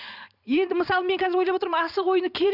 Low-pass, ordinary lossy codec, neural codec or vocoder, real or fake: 5.4 kHz; none; vocoder, 44.1 kHz, 128 mel bands every 512 samples, BigVGAN v2; fake